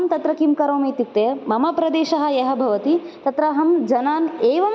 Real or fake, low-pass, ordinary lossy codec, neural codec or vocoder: real; none; none; none